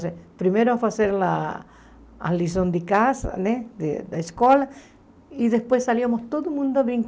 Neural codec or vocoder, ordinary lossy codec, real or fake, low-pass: none; none; real; none